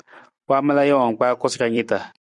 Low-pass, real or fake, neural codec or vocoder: 10.8 kHz; fake; vocoder, 44.1 kHz, 128 mel bands every 256 samples, BigVGAN v2